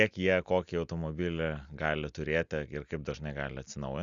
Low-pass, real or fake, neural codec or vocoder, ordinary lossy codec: 7.2 kHz; real; none; Opus, 64 kbps